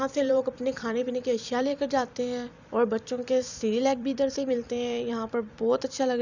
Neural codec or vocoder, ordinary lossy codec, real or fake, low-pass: vocoder, 44.1 kHz, 128 mel bands every 256 samples, BigVGAN v2; none; fake; 7.2 kHz